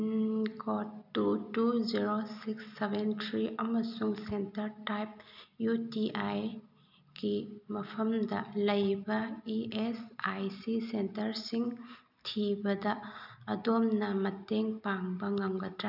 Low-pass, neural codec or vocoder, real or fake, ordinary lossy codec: 5.4 kHz; none; real; none